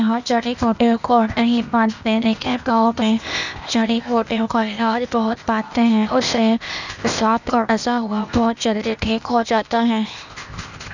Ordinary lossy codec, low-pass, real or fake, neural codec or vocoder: none; 7.2 kHz; fake; codec, 16 kHz, 0.8 kbps, ZipCodec